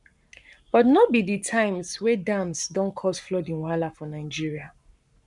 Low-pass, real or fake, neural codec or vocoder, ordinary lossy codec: 10.8 kHz; fake; codec, 44.1 kHz, 7.8 kbps, Pupu-Codec; none